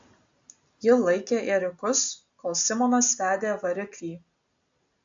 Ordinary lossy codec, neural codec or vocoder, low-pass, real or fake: AAC, 64 kbps; none; 7.2 kHz; real